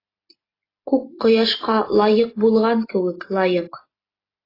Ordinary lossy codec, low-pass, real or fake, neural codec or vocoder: AAC, 24 kbps; 5.4 kHz; real; none